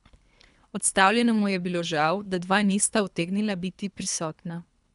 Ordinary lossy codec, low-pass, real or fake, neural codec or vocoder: none; 10.8 kHz; fake; codec, 24 kHz, 3 kbps, HILCodec